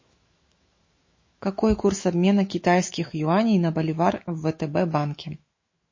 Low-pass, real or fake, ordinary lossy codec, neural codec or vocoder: 7.2 kHz; fake; MP3, 32 kbps; autoencoder, 48 kHz, 128 numbers a frame, DAC-VAE, trained on Japanese speech